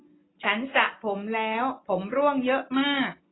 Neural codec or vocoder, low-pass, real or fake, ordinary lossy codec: none; 7.2 kHz; real; AAC, 16 kbps